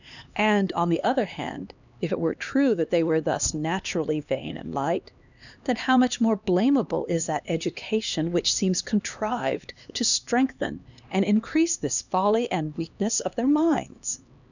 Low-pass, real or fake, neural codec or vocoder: 7.2 kHz; fake; codec, 16 kHz, 2 kbps, X-Codec, HuBERT features, trained on LibriSpeech